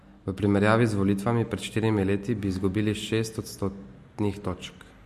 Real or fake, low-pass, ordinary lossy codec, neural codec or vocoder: real; 14.4 kHz; MP3, 64 kbps; none